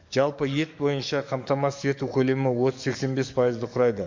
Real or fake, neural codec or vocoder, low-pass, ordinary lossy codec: fake; codec, 44.1 kHz, 7.8 kbps, DAC; 7.2 kHz; MP3, 48 kbps